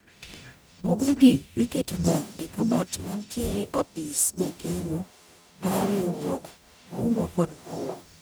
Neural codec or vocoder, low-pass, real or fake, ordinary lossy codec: codec, 44.1 kHz, 0.9 kbps, DAC; none; fake; none